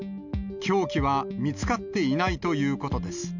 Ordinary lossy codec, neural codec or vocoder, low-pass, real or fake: none; none; 7.2 kHz; real